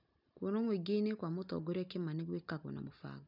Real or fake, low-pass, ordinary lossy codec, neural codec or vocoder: real; 5.4 kHz; none; none